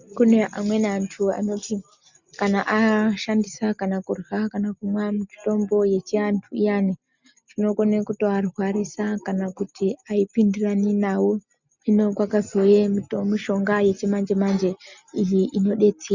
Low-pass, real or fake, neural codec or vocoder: 7.2 kHz; real; none